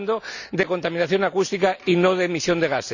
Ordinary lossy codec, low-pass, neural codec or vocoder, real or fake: none; 7.2 kHz; none; real